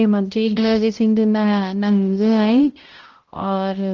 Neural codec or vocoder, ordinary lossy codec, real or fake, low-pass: codec, 16 kHz, 0.5 kbps, X-Codec, HuBERT features, trained on balanced general audio; Opus, 16 kbps; fake; 7.2 kHz